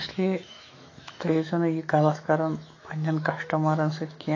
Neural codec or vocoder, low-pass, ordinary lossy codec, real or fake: autoencoder, 48 kHz, 128 numbers a frame, DAC-VAE, trained on Japanese speech; 7.2 kHz; AAC, 32 kbps; fake